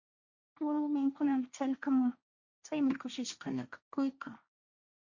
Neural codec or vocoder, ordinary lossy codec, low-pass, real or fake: codec, 16 kHz, 1.1 kbps, Voila-Tokenizer; Opus, 64 kbps; 7.2 kHz; fake